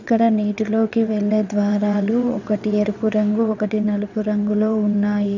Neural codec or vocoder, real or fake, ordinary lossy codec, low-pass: vocoder, 22.05 kHz, 80 mel bands, WaveNeXt; fake; none; 7.2 kHz